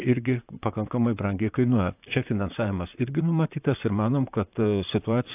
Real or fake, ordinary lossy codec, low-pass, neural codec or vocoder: fake; AAC, 32 kbps; 3.6 kHz; vocoder, 44.1 kHz, 128 mel bands, Pupu-Vocoder